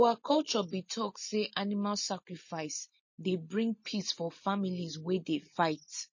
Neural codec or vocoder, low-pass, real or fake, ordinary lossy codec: none; 7.2 kHz; real; MP3, 32 kbps